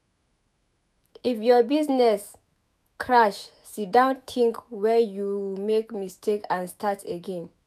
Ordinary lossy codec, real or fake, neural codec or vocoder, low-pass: none; fake; autoencoder, 48 kHz, 128 numbers a frame, DAC-VAE, trained on Japanese speech; 14.4 kHz